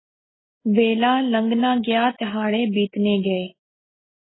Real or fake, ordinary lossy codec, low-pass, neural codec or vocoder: real; AAC, 16 kbps; 7.2 kHz; none